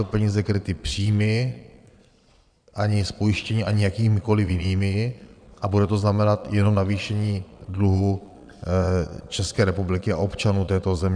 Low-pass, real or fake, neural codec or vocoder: 9.9 kHz; fake; vocoder, 22.05 kHz, 80 mel bands, Vocos